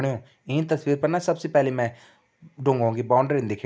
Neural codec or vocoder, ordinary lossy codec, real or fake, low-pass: none; none; real; none